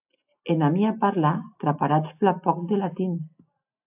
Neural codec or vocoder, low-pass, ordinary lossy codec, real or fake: none; 3.6 kHz; AAC, 32 kbps; real